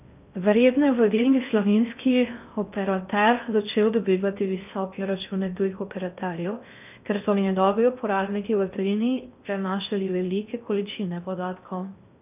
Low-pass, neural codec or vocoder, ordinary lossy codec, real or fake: 3.6 kHz; codec, 16 kHz in and 24 kHz out, 0.6 kbps, FocalCodec, streaming, 4096 codes; none; fake